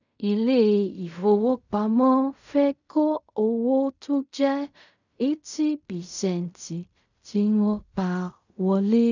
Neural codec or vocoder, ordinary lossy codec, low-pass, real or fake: codec, 16 kHz in and 24 kHz out, 0.4 kbps, LongCat-Audio-Codec, fine tuned four codebook decoder; none; 7.2 kHz; fake